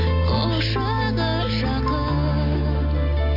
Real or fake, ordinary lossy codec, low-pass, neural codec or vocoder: real; Opus, 64 kbps; 5.4 kHz; none